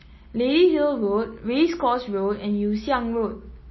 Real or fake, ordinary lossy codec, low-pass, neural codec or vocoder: real; MP3, 24 kbps; 7.2 kHz; none